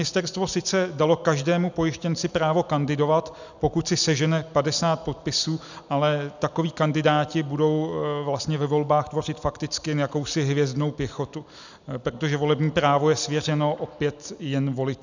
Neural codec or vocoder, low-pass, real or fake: none; 7.2 kHz; real